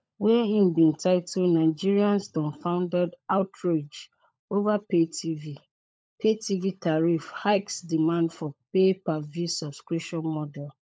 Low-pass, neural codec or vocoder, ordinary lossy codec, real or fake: none; codec, 16 kHz, 16 kbps, FunCodec, trained on LibriTTS, 50 frames a second; none; fake